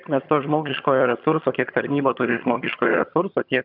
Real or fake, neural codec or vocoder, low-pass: fake; vocoder, 22.05 kHz, 80 mel bands, HiFi-GAN; 5.4 kHz